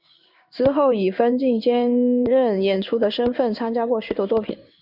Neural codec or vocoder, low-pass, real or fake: codec, 16 kHz in and 24 kHz out, 1 kbps, XY-Tokenizer; 5.4 kHz; fake